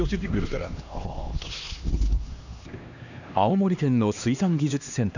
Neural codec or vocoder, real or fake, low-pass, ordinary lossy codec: codec, 16 kHz, 2 kbps, X-Codec, HuBERT features, trained on LibriSpeech; fake; 7.2 kHz; none